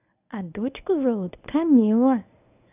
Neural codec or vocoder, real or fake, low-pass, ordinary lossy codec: codec, 24 kHz, 0.9 kbps, WavTokenizer, medium speech release version 1; fake; 3.6 kHz; none